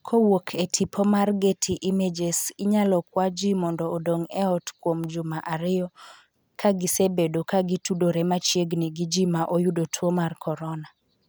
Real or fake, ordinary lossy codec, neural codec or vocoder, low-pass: real; none; none; none